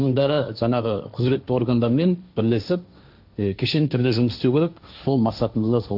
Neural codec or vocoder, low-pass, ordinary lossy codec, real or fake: codec, 16 kHz, 1.1 kbps, Voila-Tokenizer; 5.4 kHz; none; fake